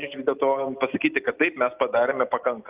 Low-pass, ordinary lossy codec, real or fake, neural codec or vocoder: 3.6 kHz; Opus, 24 kbps; real; none